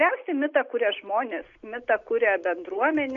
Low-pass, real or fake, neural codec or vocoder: 7.2 kHz; real; none